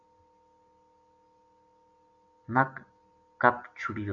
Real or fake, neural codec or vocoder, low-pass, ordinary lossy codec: real; none; 7.2 kHz; MP3, 64 kbps